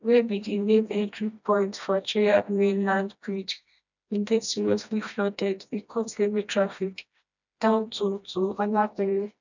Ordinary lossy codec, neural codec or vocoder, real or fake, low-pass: none; codec, 16 kHz, 1 kbps, FreqCodec, smaller model; fake; 7.2 kHz